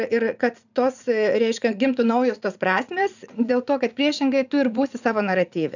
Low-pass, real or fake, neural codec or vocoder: 7.2 kHz; fake; vocoder, 44.1 kHz, 80 mel bands, Vocos